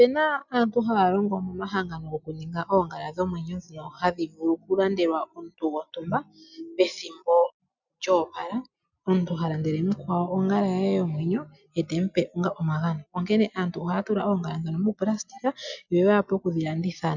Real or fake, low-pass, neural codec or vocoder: real; 7.2 kHz; none